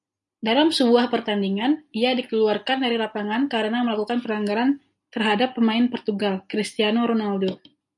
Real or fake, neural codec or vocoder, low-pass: real; none; 10.8 kHz